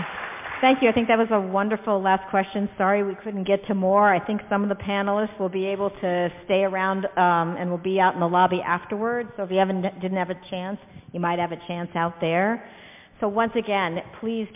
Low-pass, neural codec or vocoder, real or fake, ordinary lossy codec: 3.6 kHz; none; real; MP3, 32 kbps